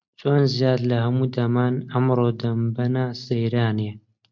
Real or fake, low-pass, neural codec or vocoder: real; 7.2 kHz; none